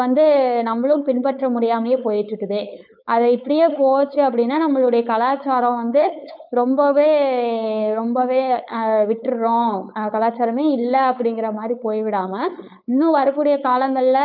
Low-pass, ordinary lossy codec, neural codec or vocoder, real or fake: 5.4 kHz; none; codec, 16 kHz, 4.8 kbps, FACodec; fake